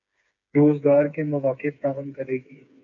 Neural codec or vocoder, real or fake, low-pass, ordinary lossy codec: codec, 16 kHz, 4 kbps, FreqCodec, smaller model; fake; 7.2 kHz; MP3, 96 kbps